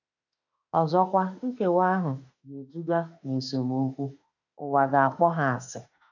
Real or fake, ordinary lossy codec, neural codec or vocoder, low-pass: fake; none; autoencoder, 48 kHz, 32 numbers a frame, DAC-VAE, trained on Japanese speech; 7.2 kHz